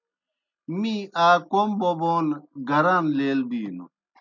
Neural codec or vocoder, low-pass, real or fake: none; 7.2 kHz; real